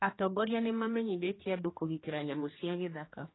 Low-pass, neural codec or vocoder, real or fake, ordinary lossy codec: 7.2 kHz; codec, 16 kHz, 2 kbps, X-Codec, HuBERT features, trained on general audio; fake; AAC, 16 kbps